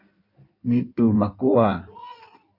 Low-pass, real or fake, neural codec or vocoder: 5.4 kHz; fake; codec, 16 kHz in and 24 kHz out, 1.1 kbps, FireRedTTS-2 codec